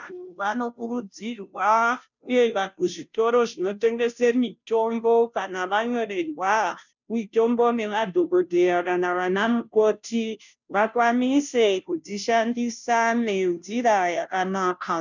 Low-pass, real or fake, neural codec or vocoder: 7.2 kHz; fake; codec, 16 kHz, 0.5 kbps, FunCodec, trained on Chinese and English, 25 frames a second